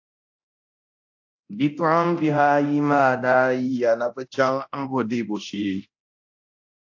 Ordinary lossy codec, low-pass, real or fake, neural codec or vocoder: AAC, 48 kbps; 7.2 kHz; fake; codec, 24 kHz, 0.9 kbps, DualCodec